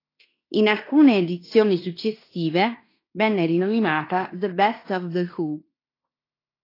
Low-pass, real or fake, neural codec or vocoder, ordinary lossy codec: 5.4 kHz; fake; codec, 16 kHz in and 24 kHz out, 0.9 kbps, LongCat-Audio-Codec, fine tuned four codebook decoder; AAC, 32 kbps